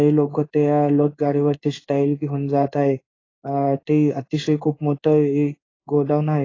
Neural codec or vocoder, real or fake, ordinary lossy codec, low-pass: codec, 16 kHz in and 24 kHz out, 1 kbps, XY-Tokenizer; fake; AAC, 32 kbps; 7.2 kHz